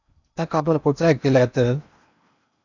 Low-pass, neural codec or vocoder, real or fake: 7.2 kHz; codec, 16 kHz in and 24 kHz out, 0.8 kbps, FocalCodec, streaming, 65536 codes; fake